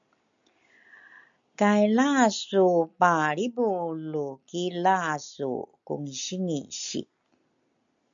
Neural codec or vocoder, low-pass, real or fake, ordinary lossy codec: none; 7.2 kHz; real; MP3, 64 kbps